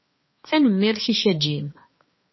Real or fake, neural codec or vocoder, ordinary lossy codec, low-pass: fake; codec, 16 kHz, 2 kbps, X-Codec, HuBERT features, trained on balanced general audio; MP3, 24 kbps; 7.2 kHz